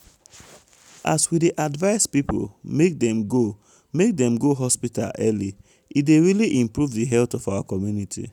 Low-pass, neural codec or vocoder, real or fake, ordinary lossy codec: 19.8 kHz; none; real; none